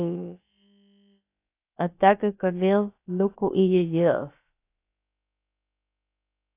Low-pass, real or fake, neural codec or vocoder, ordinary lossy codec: 3.6 kHz; fake; codec, 16 kHz, about 1 kbps, DyCAST, with the encoder's durations; AAC, 24 kbps